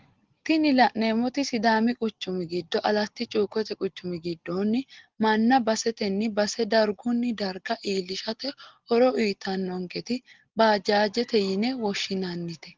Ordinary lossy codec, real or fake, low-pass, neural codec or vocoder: Opus, 16 kbps; real; 7.2 kHz; none